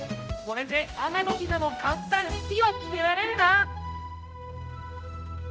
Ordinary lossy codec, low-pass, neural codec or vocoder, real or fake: none; none; codec, 16 kHz, 0.5 kbps, X-Codec, HuBERT features, trained on general audio; fake